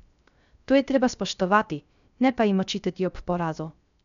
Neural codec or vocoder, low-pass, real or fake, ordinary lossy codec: codec, 16 kHz, 0.3 kbps, FocalCodec; 7.2 kHz; fake; none